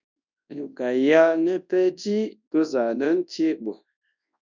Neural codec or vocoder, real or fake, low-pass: codec, 24 kHz, 0.9 kbps, WavTokenizer, large speech release; fake; 7.2 kHz